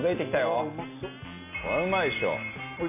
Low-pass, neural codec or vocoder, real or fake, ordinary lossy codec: 3.6 kHz; none; real; none